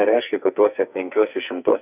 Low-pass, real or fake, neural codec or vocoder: 3.6 kHz; fake; codec, 44.1 kHz, 2.6 kbps, SNAC